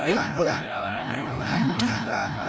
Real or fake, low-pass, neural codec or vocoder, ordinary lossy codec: fake; none; codec, 16 kHz, 0.5 kbps, FreqCodec, larger model; none